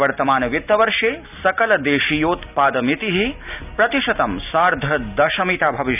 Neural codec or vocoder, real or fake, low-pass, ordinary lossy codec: none; real; 3.6 kHz; none